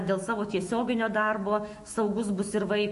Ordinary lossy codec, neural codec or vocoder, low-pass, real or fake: MP3, 48 kbps; autoencoder, 48 kHz, 128 numbers a frame, DAC-VAE, trained on Japanese speech; 14.4 kHz; fake